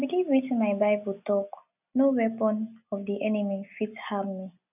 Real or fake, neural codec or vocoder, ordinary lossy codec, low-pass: real; none; none; 3.6 kHz